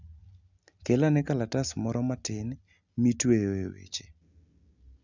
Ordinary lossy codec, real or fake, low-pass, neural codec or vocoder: none; real; 7.2 kHz; none